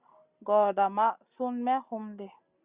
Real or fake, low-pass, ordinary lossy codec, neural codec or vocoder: real; 3.6 kHz; Opus, 64 kbps; none